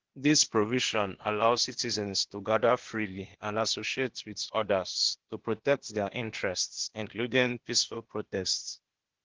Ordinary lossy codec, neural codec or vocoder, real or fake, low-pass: Opus, 16 kbps; codec, 16 kHz, 0.8 kbps, ZipCodec; fake; 7.2 kHz